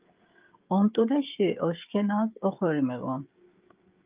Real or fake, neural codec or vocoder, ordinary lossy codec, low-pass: fake; codec, 16 kHz, 16 kbps, FreqCodec, smaller model; Opus, 24 kbps; 3.6 kHz